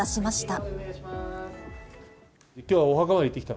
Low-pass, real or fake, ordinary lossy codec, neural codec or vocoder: none; real; none; none